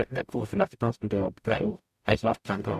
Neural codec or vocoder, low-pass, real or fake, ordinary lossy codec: codec, 44.1 kHz, 0.9 kbps, DAC; 14.4 kHz; fake; AAC, 96 kbps